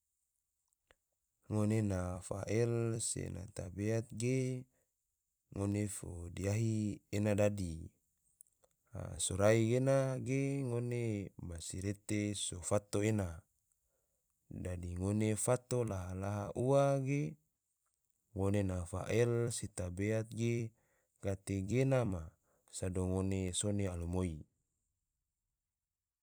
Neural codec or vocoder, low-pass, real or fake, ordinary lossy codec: vocoder, 44.1 kHz, 128 mel bands every 256 samples, BigVGAN v2; none; fake; none